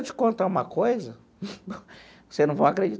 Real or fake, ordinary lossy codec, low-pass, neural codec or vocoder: real; none; none; none